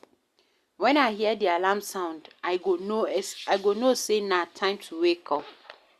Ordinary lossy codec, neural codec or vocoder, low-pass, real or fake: Opus, 64 kbps; none; 14.4 kHz; real